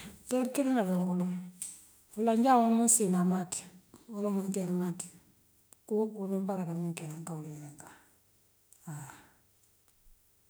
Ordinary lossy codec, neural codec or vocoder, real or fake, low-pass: none; autoencoder, 48 kHz, 32 numbers a frame, DAC-VAE, trained on Japanese speech; fake; none